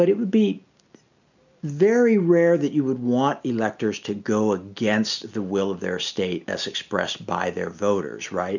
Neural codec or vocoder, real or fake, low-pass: none; real; 7.2 kHz